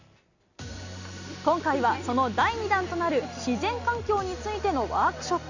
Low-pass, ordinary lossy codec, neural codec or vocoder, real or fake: 7.2 kHz; none; none; real